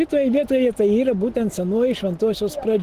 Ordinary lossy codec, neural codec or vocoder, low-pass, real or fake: Opus, 16 kbps; none; 14.4 kHz; real